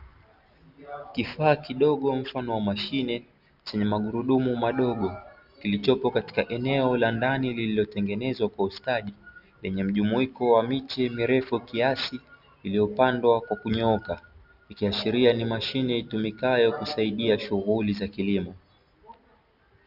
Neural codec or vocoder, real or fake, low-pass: none; real; 5.4 kHz